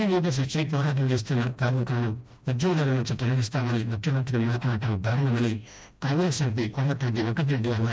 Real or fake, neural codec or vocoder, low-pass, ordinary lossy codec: fake; codec, 16 kHz, 1 kbps, FreqCodec, smaller model; none; none